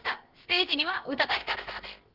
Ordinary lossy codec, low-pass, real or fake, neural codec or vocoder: Opus, 16 kbps; 5.4 kHz; fake; codec, 16 kHz, about 1 kbps, DyCAST, with the encoder's durations